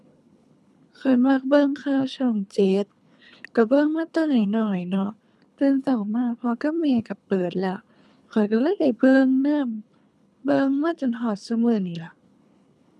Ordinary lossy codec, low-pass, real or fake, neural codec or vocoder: none; none; fake; codec, 24 kHz, 3 kbps, HILCodec